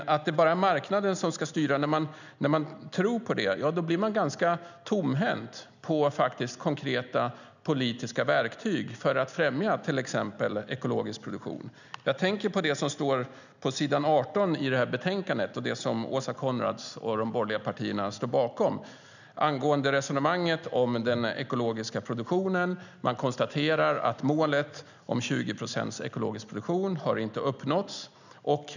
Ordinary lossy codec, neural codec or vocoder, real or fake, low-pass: none; none; real; 7.2 kHz